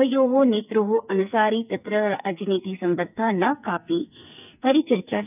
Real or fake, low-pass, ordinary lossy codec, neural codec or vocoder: fake; 3.6 kHz; none; codec, 44.1 kHz, 2.6 kbps, SNAC